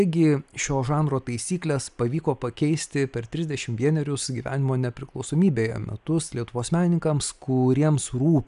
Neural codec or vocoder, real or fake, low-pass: none; real; 10.8 kHz